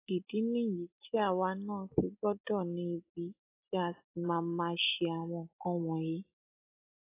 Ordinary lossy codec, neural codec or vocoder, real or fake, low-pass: AAC, 24 kbps; none; real; 3.6 kHz